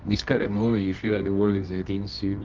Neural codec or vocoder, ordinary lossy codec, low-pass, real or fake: codec, 24 kHz, 0.9 kbps, WavTokenizer, medium music audio release; Opus, 32 kbps; 7.2 kHz; fake